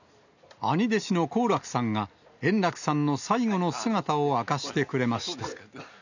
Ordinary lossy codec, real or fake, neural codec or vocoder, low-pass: none; real; none; 7.2 kHz